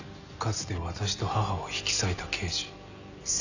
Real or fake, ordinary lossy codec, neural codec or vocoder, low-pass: real; none; none; 7.2 kHz